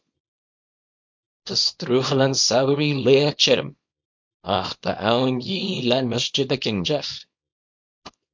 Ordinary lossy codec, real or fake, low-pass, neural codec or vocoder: MP3, 48 kbps; fake; 7.2 kHz; codec, 24 kHz, 0.9 kbps, WavTokenizer, small release